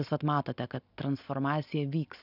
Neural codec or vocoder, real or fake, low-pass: none; real; 5.4 kHz